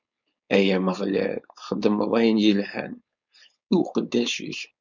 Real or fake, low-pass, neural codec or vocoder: fake; 7.2 kHz; codec, 16 kHz, 4.8 kbps, FACodec